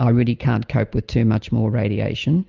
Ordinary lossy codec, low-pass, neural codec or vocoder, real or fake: Opus, 24 kbps; 7.2 kHz; none; real